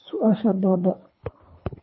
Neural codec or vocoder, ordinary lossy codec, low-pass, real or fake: codec, 24 kHz, 3 kbps, HILCodec; MP3, 24 kbps; 7.2 kHz; fake